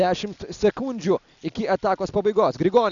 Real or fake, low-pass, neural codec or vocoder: real; 7.2 kHz; none